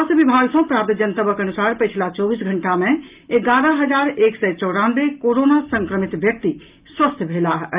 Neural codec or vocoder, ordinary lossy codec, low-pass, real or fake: none; Opus, 24 kbps; 3.6 kHz; real